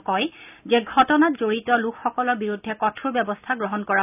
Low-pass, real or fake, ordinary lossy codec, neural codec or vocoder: 3.6 kHz; real; none; none